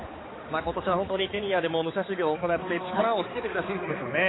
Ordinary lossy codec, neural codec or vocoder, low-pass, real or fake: AAC, 16 kbps; codec, 16 kHz, 4 kbps, X-Codec, HuBERT features, trained on balanced general audio; 7.2 kHz; fake